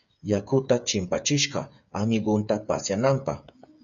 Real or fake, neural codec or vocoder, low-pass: fake; codec, 16 kHz, 8 kbps, FreqCodec, smaller model; 7.2 kHz